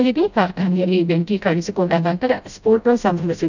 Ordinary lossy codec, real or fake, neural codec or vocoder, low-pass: none; fake; codec, 16 kHz, 0.5 kbps, FreqCodec, smaller model; 7.2 kHz